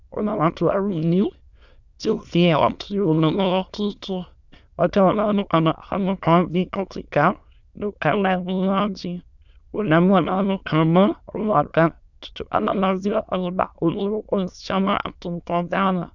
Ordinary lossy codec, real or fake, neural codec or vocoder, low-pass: Opus, 64 kbps; fake; autoencoder, 22.05 kHz, a latent of 192 numbers a frame, VITS, trained on many speakers; 7.2 kHz